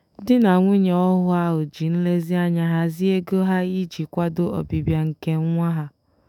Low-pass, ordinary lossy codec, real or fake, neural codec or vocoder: 19.8 kHz; none; fake; autoencoder, 48 kHz, 128 numbers a frame, DAC-VAE, trained on Japanese speech